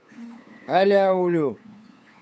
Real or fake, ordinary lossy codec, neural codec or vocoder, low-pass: fake; none; codec, 16 kHz, 8 kbps, FunCodec, trained on LibriTTS, 25 frames a second; none